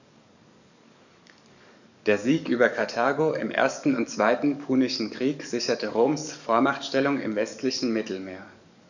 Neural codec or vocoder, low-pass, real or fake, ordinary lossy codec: codec, 44.1 kHz, 7.8 kbps, DAC; 7.2 kHz; fake; none